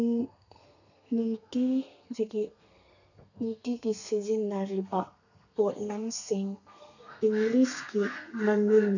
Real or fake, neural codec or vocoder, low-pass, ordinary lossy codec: fake; codec, 32 kHz, 1.9 kbps, SNAC; 7.2 kHz; none